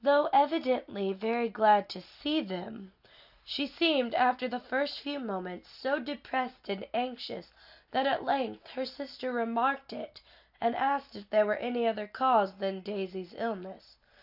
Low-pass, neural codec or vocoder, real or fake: 5.4 kHz; none; real